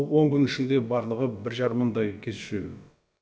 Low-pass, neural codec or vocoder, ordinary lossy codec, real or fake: none; codec, 16 kHz, about 1 kbps, DyCAST, with the encoder's durations; none; fake